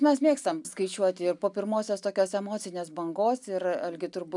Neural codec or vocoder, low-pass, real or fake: none; 10.8 kHz; real